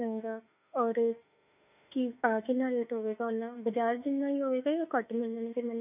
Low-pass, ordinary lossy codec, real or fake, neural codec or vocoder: 3.6 kHz; none; fake; autoencoder, 48 kHz, 32 numbers a frame, DAC-VAE, trained on Japanese speech